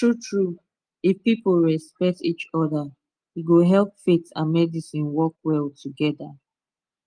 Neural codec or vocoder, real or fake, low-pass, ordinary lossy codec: none; real; 9.9 kHz; Opus, 32 kbps